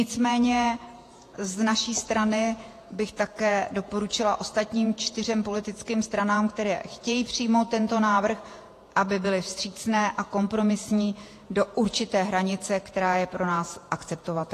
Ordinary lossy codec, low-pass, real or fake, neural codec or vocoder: AAC, 48 kbps; 14.4 kHz; fake; vocoder, 48 kHz, 128 mel bands, Vocos